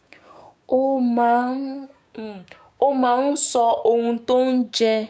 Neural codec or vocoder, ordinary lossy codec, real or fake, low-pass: codec, 16 kHz, 6 kbps, DAC; none; fake; none